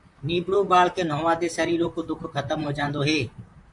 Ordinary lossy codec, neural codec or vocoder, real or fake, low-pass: MP3, 64 kbps; vocoder, 44.1 kHz, 128 mel bands, Pupu-Vocoder; fake; 10.8 kHz